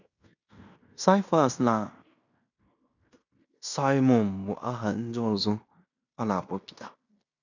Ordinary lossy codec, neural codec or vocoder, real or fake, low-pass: none; codec, 16 kHz in and 24 kHz out, 0.9 kbps, LongCat-Audio-Codec, fine tuned four codebook decoder; fake; 7.2 kHz